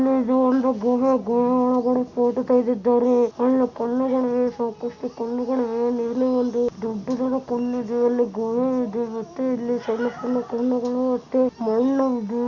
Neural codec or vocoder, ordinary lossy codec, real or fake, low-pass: none; Opus, 64 kbps; real; 7.2 kHz